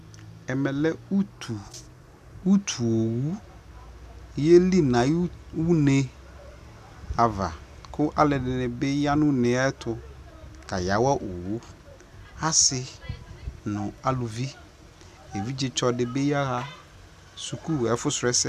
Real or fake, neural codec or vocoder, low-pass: real; none; 14.4 kHz